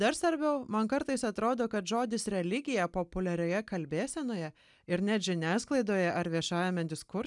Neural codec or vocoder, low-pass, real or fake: none; 10.8 kHz; real